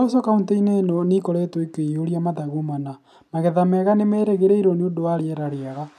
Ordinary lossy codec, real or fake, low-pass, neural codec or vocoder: none; real; 14.4 kHz; none